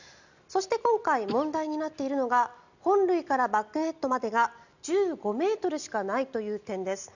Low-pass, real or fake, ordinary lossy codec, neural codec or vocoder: 7.2 kHz; real; none; none